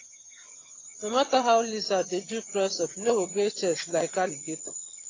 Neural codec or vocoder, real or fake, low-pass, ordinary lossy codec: vocoder, 22.05 kHz, 80 mel bands, HiFi-GAN; fake; 7.2 kHz; AAC, 32 kbps